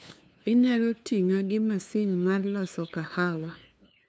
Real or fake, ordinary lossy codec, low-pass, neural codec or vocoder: fake; none; none; codec, 16 kHz, 2 kbps, FunCodec, trained on LibriTTS, 25 frames a second